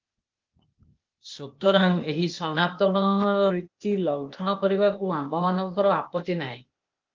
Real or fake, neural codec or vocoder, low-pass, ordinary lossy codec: fake; codec, 16 kHz, 0.8 kbps, ZipCodec; 7.2 kHz; Opus, 32 kbps